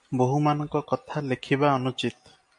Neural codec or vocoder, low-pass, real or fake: none; 10.8 kHz; real